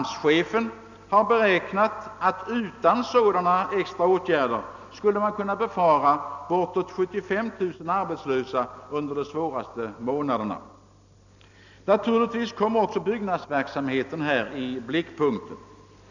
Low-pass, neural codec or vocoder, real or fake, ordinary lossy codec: 7.2 kHz; none; real; none